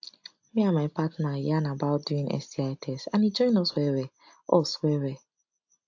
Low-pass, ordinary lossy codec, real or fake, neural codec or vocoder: 7.2 kHz; AAC, 48 kbps; real; none